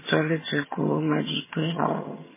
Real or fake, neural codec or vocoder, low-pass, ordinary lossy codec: fake; vocoder, 22.05 kHz, 80 mel bands, HiFi-GAN; 3.6 kHz; MP3, 16 kbps